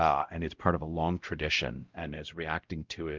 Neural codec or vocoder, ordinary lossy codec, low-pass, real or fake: codec, 16 kHz, 0.5 kbps, X-Codec, WavLM features, trained on Multilingual LibriSpeech; Opus, 32 kbps; 7.2 kHz; fake